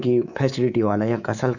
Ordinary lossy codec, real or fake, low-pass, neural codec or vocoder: none; fake; 7.2 kHz; codec, 24 kHz, 3.1 kbps, DualCodec